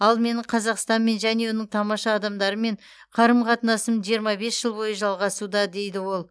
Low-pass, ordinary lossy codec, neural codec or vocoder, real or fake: none; none; none; real